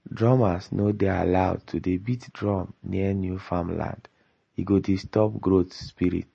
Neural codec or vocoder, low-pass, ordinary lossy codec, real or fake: none; 10.8 kHz; MP3, 32 kbps; real